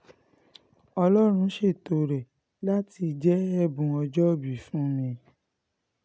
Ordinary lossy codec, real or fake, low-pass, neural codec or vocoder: none; real; none; none